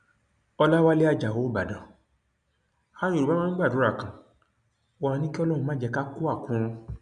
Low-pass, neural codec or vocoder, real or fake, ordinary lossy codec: 9.9 kHz; none; real; none